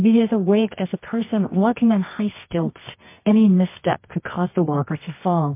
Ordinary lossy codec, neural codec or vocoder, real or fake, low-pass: MP3, 24 kbps; codec, 24 kHz, 0.9 kbps, WavTokenizer, medium music audio release; fake; 3.6 kHz